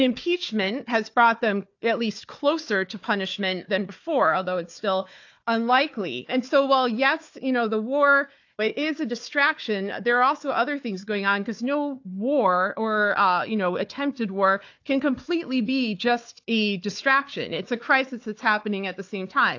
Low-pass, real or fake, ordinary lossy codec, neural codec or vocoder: 7.2 kHz; fake; AAC, 48 kbps; codec, 16 kHz, 4 kbps, FunCodec, trained on Chinese and English, 50 frames a second